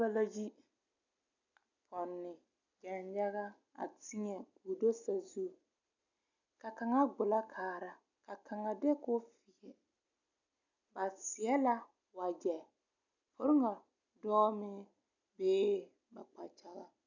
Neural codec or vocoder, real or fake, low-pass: none; real; 7.2 kHz